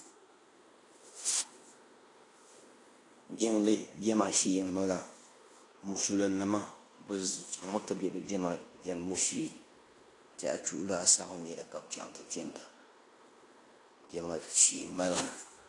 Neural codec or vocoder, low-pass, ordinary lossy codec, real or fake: codec, 16 kHz in and 24 kHz out, 0.9 kbps, LongCat-Audio-Codec, fine tuned four codebook decoder; 10.8 kHz; AAC, 48 kbps; fake